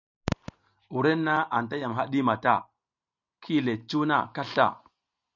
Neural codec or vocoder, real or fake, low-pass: none; real; 7.2 kHz